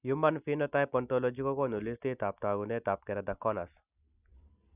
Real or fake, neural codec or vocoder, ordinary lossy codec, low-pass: real; none; none; 3.6 kHz